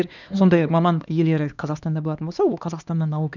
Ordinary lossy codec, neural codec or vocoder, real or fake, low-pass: none; codec, 16 kHz, 2 kbps, X-Codec, HuBERT features, trained on LibriSpeech; fake; 7.2 kHz